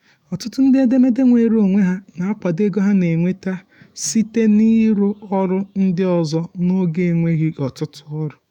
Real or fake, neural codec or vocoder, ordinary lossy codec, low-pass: fake; autoencoder, 48 kHz, 128 numbers a frame, DAC-VAE, trained on Japanese speech; none; 19.8 kHz